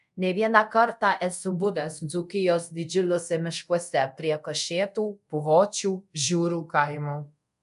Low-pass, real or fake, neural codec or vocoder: 10.8 kHz; fake; codec, 24 kHz, 0.5 kbps, DualCodec